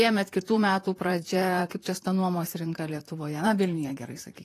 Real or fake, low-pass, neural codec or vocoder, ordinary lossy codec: fake; 14.4 kHz; vocoder, 44.1 kHz, 128 mel bands, Pupu-Vocoder; AAC, 48 kbps